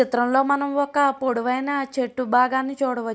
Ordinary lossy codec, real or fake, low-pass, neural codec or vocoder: none; real; none; none